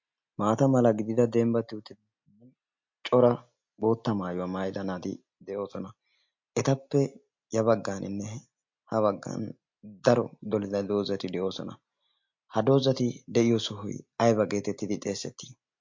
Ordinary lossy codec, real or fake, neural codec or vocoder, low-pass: MP3, 48 kbps; real; none; 7.2 kHz